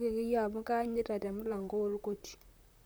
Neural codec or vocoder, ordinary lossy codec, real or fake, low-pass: vocoder, 44.1 kHz, 128 mel bands, Pupu-Vocoder; none; fake; none